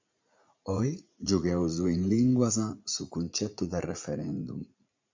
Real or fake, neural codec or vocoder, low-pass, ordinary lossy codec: fake; vocoder, 44.1 kHz, 80 mel bands, Vocos; 7.2 kHz; MP3, 64 kbps